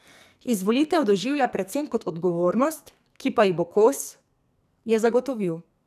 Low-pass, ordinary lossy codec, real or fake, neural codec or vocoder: 14.4 kHz; none; fake; codec, 44.1 kHz, 2.6 kbps, SNAC